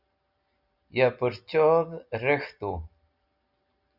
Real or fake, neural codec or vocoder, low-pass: real; none; 5.4 kHz